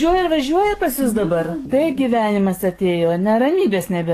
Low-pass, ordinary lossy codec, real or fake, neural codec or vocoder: 14.4 kHz; AAC, 48 kbps; fake; codec, 44.1 kHz, 7.8 kbps, DAC